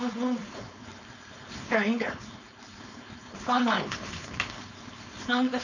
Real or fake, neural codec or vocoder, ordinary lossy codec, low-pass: fake; codec, 16 kHz, 4.8 kbps, FACodec; none; 7.2 kHz